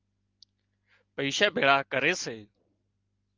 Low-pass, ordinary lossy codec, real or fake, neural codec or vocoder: 7.2 kHz; Opus, 32 kbps; real; none